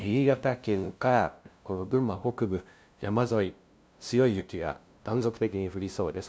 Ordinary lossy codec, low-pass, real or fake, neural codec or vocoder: none; none; fake; codec, 16 kHz, 0.5 kbps, FunCodec, trained on LibriTTS, 25 frames a second